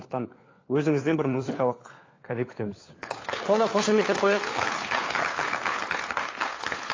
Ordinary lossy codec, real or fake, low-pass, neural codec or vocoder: AAC, 32 kbps; fake; 7.2 kHz; codec, 16 kHz, 4 kbps, FunCodec, trained on LibriTTS, 50 frames a second